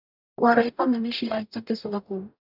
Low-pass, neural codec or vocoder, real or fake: 5.4 kHz; codec, 44.1 kHz, 0.9 kbps, DAC; fake